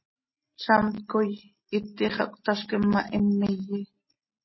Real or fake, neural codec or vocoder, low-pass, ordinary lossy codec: real; none; 7.2 kHz; MP3, 24 kbps